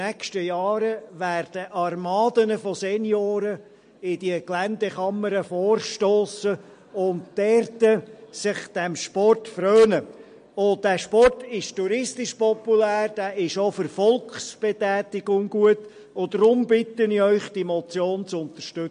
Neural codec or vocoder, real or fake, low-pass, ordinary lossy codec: none; real; 9.9 kHz; MP3, 48 kbps